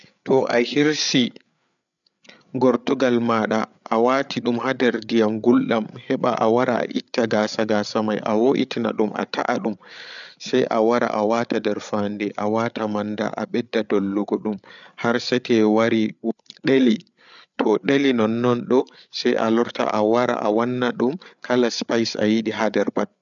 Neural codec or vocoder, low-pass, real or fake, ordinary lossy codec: codec, 16 kHz, 8 kbps, FreqCodec, larger model; 7.2 kHz; fake; none